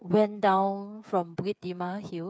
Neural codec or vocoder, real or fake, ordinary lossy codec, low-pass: codec, 16 kHz, 8 kbps, FreqCodec, smaller model; fake; none; none